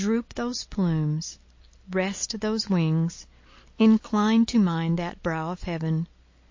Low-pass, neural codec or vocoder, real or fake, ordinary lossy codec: 7.2 kHz; none; real; MP3, 32 kbps